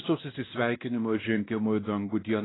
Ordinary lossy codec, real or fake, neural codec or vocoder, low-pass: AAC, 16 kbps; fake; codec, 16 kHz, 1 kbps, X-Codec, WavLM features, trained on Multilingual LibriSpeech; 7.2 kHz